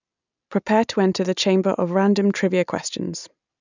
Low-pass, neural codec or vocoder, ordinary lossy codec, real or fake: 7.2 kHz; none; none; real